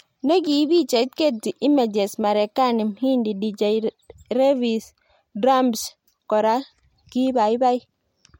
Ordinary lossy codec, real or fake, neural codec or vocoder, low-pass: MP3, 64 kbps; real; none; 19.8 kHz